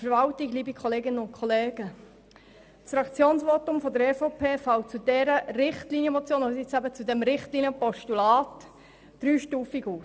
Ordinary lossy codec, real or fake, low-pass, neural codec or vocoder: none; real; none; none